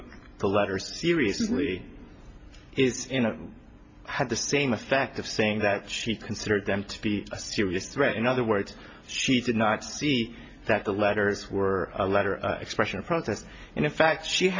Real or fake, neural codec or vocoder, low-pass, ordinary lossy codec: real; none; 7.2 kHz; MP3, 48 kbps